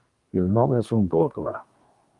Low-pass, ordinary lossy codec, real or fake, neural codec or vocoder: 10.8 kHz; Opus, 32 kbps; fake; codec, 24 kHz, 1 kbps, SNAC